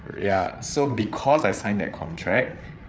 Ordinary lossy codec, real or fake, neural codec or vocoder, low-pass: none; fake; codec, 16 kHz, 8 kbps, FreqCodec, larger model; none